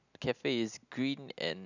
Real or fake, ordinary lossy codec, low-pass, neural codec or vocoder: real; none; 7.2 kHz; none